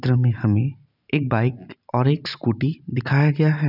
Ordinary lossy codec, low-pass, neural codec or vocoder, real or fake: none; 5.4 kHz; vocoder, 44.1 kHz, 128 mel bands every 512 samples, BigVGAN v2; fake